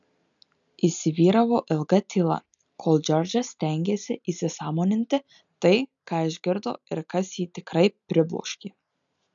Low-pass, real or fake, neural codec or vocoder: 7.2 kHz; real; none